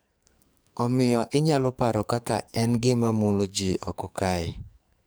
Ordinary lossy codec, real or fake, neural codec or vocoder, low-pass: none; fake; codec, 44.1 kHz, 2.6 kbps, SNAC; none